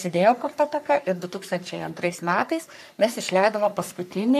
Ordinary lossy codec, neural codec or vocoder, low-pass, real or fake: AAC, 96 kbps; codec, 44.1 kHz, 3.4 kbps, Pupu-Codec; 14.4 kHz; fake